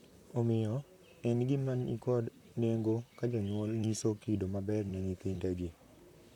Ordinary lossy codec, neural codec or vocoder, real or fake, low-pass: none; vocoder, 44.1 kHz, 128 mel bands, Pupu-Vocoder; fake; 19.8 kHz